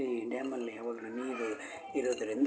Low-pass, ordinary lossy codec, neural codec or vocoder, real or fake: none; none; none; real